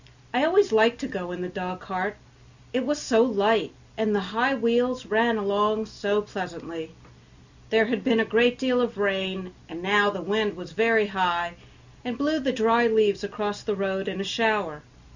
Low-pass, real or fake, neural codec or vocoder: 7.2 kHz; real; none